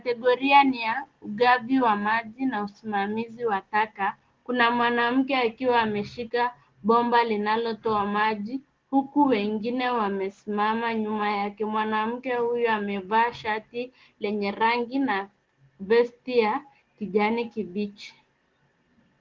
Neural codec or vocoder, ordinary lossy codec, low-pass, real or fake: none; Opus, 16 kbps; 7.2 kHz; real